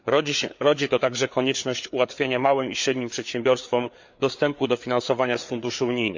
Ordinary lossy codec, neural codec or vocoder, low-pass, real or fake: MP3, 64 kbps; codec, 16 kHz, 4 kbps, FreqCodec, larger model; 7.2 kHz; fake